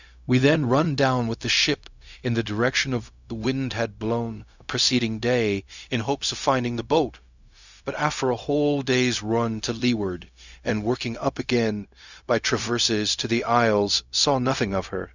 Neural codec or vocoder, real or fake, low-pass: codec, 16 kHz, 0.4 kbps, LongCat-Audio-Codec; fake; 7.2 kHz